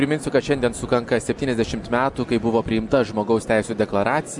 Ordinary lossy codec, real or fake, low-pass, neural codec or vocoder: MP3, 96 kbps; real; 10.8 kHz; none